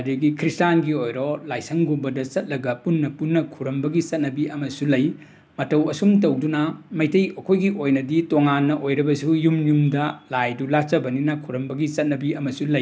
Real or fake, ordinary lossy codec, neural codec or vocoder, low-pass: real; none; none; none